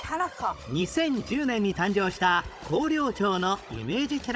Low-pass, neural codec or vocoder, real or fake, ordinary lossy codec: none; codec, 16 kHz, 16 kbps, FunCodec, trained on Chinese and English, 50 frames a second; fake; none